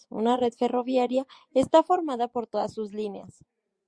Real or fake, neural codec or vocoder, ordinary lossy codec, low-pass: fake; vocoder, 24 kHz, 100 mel bands, Vocos; Opus, 64 kbps; 9.9 kHz